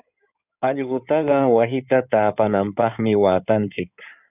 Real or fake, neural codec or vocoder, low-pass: fake; codec, 16 kHz in and 24 kHz out, 2.2 kbps, FireRedTTS-2 codec; 3.6 kHz